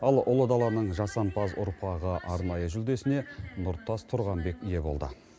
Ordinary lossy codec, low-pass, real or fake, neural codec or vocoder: none; none; real; none